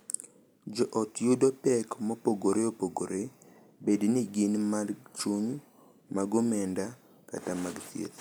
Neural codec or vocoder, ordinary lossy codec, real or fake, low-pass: none; none; real; none